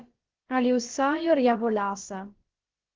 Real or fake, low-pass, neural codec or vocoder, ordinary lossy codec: fake; 7.2 kHz; codec, 16 kHz, about 1 kbps, DyCAST, with the encoder's durations; Opus, 16 kbps